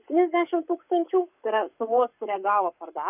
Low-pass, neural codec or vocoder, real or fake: 3.6 kHz; codec, 44.1 kHz, 7.8 kbps, Pupu-Codec; fake